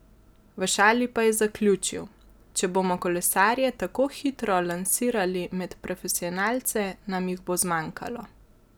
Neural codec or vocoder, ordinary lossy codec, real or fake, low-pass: none; none; real; none